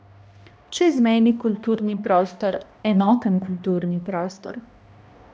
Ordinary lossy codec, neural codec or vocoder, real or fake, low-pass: none; codec, 16 kHz, 1 kbps, X-Codec, HuBERT features, trained on balanced general audio; fake; none